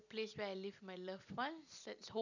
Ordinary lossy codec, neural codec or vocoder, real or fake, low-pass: none; none; real; 7.2 kHz